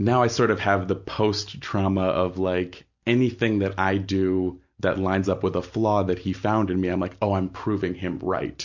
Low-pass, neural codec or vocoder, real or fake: 7.2 kHz; none; real